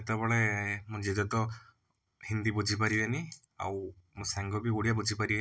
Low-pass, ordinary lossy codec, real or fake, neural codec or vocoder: none; none; real; none